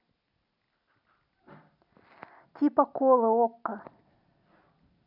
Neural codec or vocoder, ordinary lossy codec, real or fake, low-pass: none; none; real; 5.4 kHz